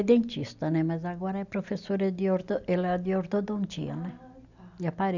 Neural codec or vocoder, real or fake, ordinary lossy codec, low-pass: none; real; none; 7.2 kHz